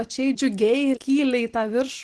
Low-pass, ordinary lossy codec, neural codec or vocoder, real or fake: 10.8 kHz; Opus, 16 kbps; none; real